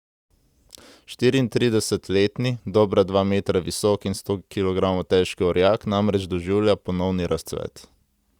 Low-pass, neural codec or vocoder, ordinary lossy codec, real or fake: 19.8 kHz; none; Opus, 64 kbps; real